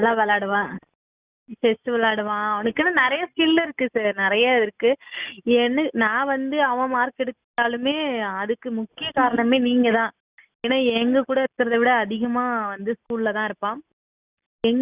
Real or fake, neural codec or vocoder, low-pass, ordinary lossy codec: real; none; 3.6 kHz; Opus, 64 kbps